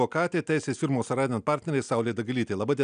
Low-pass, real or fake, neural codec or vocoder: 9.9 kHz; real; none